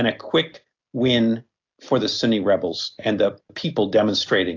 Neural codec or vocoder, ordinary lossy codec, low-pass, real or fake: none; AAC, 48 kbps; 7.2 kHz; real